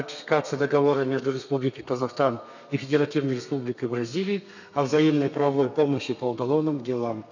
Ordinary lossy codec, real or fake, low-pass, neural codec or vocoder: none; fake; 7.2 kHz; codec, 32 kHz, 1.9 kbps, SNAC